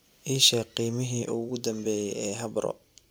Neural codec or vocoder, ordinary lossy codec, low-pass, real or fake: vocoder, 44.1 kHz, 128 mel bands every 256 samples, BigVGAN v2; none; none; fake